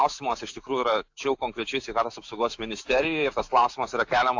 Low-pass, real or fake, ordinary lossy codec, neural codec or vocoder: 7.2 kHz; fake; AAC, 48 kbps; autoencoder, 48 kHz, 128 numbers a frame, DAC-VAE, trained on Japanese speech